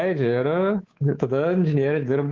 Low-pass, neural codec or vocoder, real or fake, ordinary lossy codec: 7.2 kHz; codec, 24 kHz, 3.1 kbps, DualCodec; fake; Opus, 16 kbps